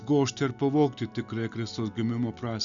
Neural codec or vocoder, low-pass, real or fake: none; 7.2 kHz; real